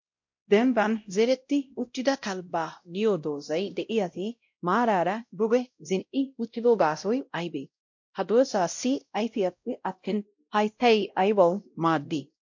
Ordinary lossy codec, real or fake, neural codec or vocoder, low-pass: MP3, 48 kbps; fake; codec, 16 kHz, 0.5 kbps, X-Codec, WavLM features, trained on Multilingual LibriSpeech; 7.2 kHz